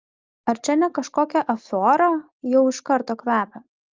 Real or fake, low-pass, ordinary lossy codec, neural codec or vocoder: real; 7.2 kHz; Opus, 32 kbps; none